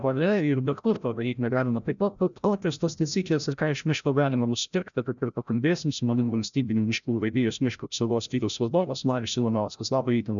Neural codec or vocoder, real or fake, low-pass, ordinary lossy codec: codec, 16 kHz, 0.5 kbps, FreqCodec, larger model; fake; 7.2 kHz; MP3, 96 kbps